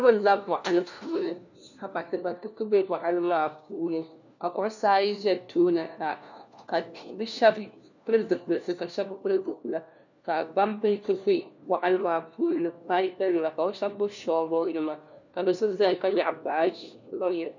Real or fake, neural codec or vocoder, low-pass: fake; codec, 16 kHz, 1 kbps, FunCodec, trained on LibriTTS, 50 frames a second; 7.2 kHz